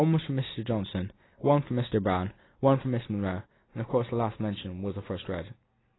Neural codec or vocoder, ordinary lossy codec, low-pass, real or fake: none; AAC, 16 kbps; 7.2 kHz; real